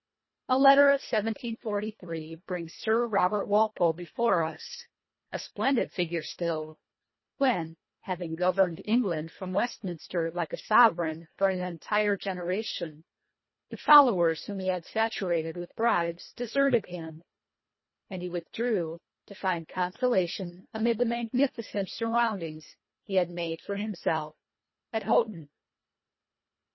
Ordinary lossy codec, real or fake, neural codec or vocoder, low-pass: MP3, 24 kbps; fake; codec, 24 kHz, 1.5 kbps, HILCodec; 7.2 kHz